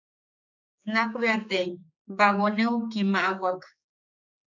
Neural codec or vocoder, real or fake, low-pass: codec, 16 kHz, 4 kbps, X-Codec, HuBERT features, trained on general audio; fake; 7.2 kHz